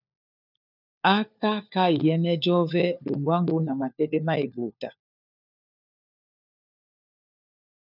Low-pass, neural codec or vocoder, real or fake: 5.4 kHz; codec, 16 kHz, 4 kbps, FunCodec, trained on LibriTTS, 50 frames a second; fake